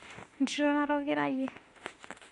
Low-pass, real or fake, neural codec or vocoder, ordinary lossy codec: 14.4 kHz; fake; autoencoder, 48 kHz, 32 numbers a frame, DAC-VAE, trained on Japanese speech; MP3, 48 kbps